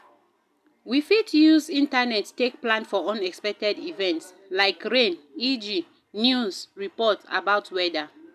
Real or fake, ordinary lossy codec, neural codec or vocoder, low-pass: real; none; none; 14.4 kHz